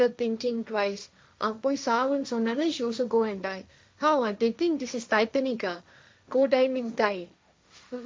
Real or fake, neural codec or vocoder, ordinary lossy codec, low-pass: fake; codec, 16 kHz, 1.1 kbps, Voila-Tokenizer; none; none